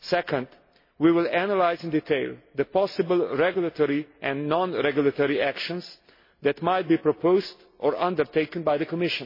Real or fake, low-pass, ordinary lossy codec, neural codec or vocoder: real; 5.4 kHz; MP3, 24 kbps; none